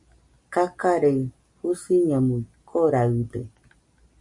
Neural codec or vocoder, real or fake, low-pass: none; real; 10.8 kHz